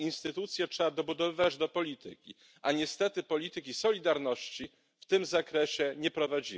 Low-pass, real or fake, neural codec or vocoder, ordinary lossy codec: none; real; none; none